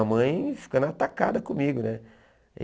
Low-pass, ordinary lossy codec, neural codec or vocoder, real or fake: none; none; none; real